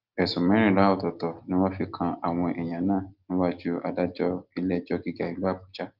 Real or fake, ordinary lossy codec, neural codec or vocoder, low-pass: real; Opus, 32 kbps; none; 5.4 kHz